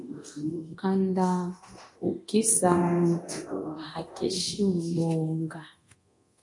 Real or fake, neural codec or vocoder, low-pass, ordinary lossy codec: fake; codec, 24 kHz, 0.9 kbps, DualCodec; 10.8 kHz; MP3, 48 kbps